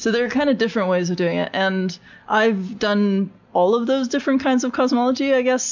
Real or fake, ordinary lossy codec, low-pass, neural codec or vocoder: real; MP3, 64 kbps; 7.2 kHz; none